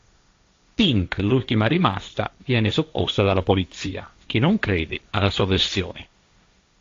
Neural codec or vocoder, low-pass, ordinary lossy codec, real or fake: codec, 16 kHz, 1.1 kbps, Voila-Tokenizer; 7.2 kHz; AAC, 48 kbps; fake